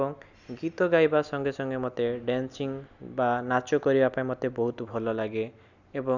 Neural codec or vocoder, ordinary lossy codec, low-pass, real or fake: none; none; 7.2 kHz; real